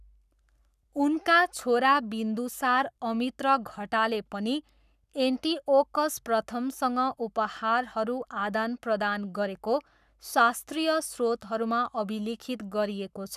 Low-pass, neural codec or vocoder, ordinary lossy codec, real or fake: 14.4 kHz; codec, 44.1 kHz, 7.8 kbps, Pupu-Codec; none; fake